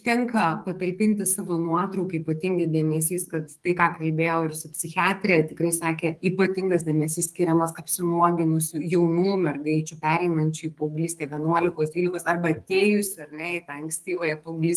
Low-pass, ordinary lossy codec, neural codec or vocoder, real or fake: 14.4 kHz; Opus, 32 kbps; codec, 44.1 kHz, 2.6 kbps, SNAC; fake